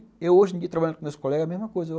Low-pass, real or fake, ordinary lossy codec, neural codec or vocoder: none; real; none; none